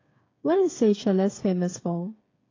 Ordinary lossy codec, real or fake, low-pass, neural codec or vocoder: AAC, 32 kbps; fake; 7.2 kHz; codec, 16 kHz, 8 kbps, FreqCodec, smaller model